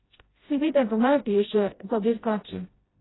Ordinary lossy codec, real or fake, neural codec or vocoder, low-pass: AAC, 16 kbps; fake; codec, 16 kHz, 0.5 kbps, FreqCodec, smaller model; 7.2 kHz